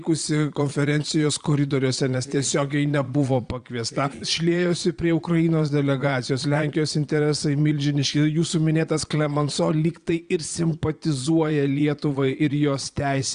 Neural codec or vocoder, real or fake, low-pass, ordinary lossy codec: vocoder, 22.05 kHz, 80 mel bands, Vocos; fake; 9.9 kHz; Opus, 64 kbps